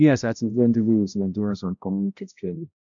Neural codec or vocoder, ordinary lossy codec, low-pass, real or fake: codec, 16 kHz, 0.5 kbps, X-Codec, HuBERT features, trained on balanced general audio; none; 7.2 kHz; fake